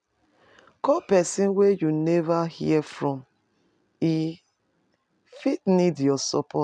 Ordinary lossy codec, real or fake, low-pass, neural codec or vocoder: none; real; 9.9 kHz; none